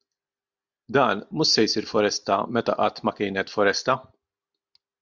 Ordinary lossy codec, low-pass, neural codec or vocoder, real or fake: Opus, 64 kbps; 7.2 kHz; none; real